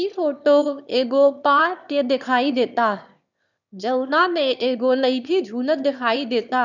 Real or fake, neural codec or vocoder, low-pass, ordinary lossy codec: fake; autoencoder, 22.05 kHz, a latent of 192 numbers a frame, VITS, trained on one speaker; 7.2 kHz; none